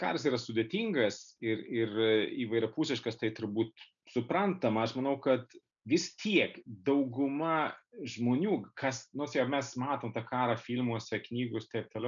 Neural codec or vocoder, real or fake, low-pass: none; real; 7.2 kHz